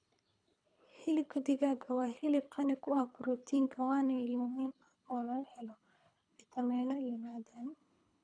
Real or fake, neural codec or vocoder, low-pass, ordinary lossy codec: fake; codec, 24 kHz, 3 kbps, HILCodec; 9.9 kHz; none